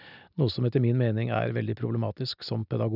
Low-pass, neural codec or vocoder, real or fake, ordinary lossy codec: 5.4 kHz; none; real; none